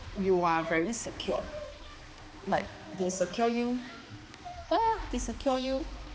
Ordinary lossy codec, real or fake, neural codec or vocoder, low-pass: none; fake; codec, 16 kHz, 2 kbps, X-Codec, HuBERT features, trained on balanced general audio; none